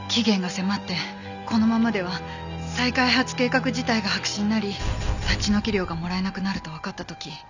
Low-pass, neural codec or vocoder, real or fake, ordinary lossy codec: 7.2 kHz; none; real; none